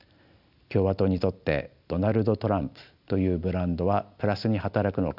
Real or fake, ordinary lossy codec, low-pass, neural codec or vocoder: real; none; 5.4 kHz; none